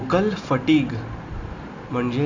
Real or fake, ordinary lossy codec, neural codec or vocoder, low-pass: real; AAC, 48 kbps; none; 7.2 kHz